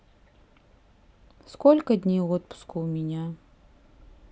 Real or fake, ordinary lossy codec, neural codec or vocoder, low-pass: real; none; none; none